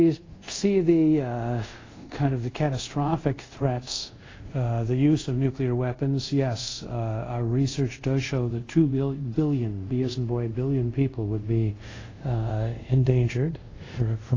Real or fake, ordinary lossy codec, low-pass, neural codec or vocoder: fake; AAC, 32 kbps; 7.2 kHz; codec, 24 kHz, 0.5 kbps, DualCodec